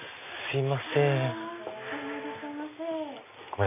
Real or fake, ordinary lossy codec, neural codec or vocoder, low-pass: real; none; none; 3.6 kHz